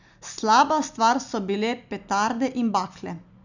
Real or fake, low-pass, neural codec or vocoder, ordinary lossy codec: real; 7.2 kHz; none; none